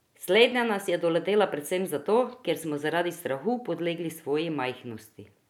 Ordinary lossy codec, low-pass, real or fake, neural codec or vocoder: none; 19.8 kHz; real; none